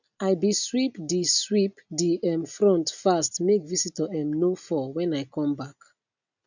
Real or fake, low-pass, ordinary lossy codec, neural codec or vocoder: real; 7.2 kHz; none; none